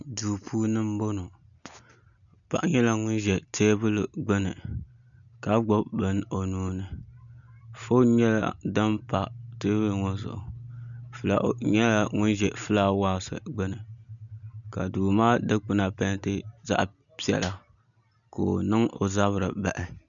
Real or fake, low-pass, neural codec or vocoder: real; 7.2 kHz; none